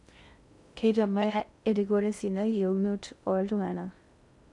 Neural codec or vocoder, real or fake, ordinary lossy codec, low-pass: codec, 16 kHz in and 24 kHz out, 0.6 kbps, FocalCodec, streaming, 2048 codes; fake; none; 10.8 kHz